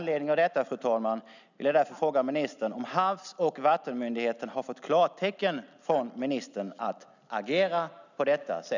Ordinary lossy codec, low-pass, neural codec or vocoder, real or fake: none; 7.2 kHz; none; real